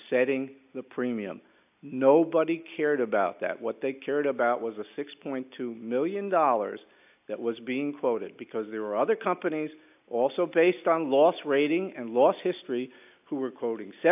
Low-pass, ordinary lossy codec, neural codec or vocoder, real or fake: 3.6 kHz; AAC, 32 kbps; none; real